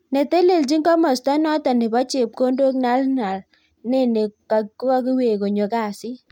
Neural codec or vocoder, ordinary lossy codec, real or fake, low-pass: none; MP3, 96 kbps; real; 19.8 kHz